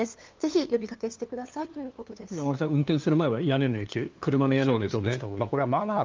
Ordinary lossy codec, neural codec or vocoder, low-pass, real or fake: Opus, 32 kbps; codec, 16 kHz, 2 kbps, FunCodec, trained on LibriTTS, 25 frames a second; 7.2 kHz; fake